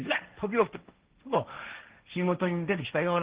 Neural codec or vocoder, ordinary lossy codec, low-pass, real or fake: codec, 16 kHz, 1.1 kbps, Voila-Tokenizer; Opus, 16 kbps; 3.6 kHz; fake